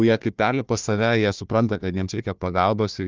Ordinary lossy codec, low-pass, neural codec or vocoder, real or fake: Opus, 32 kbps; 7.2 kHz; codec, 16 kHz, 1 kbps, FunCodec, trained on Chinese and English, 50 frames a second; fake